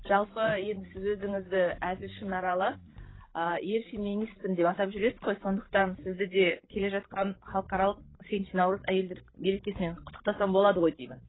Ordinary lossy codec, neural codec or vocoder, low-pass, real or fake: AAC, 16 kbps; codec, 16 kHz, 16 kbps, FreqCodec, larger model; 7.2 kHz; fake